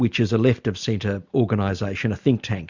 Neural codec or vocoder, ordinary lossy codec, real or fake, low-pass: none; Opus, 64 kbps; real; 7.2 kHz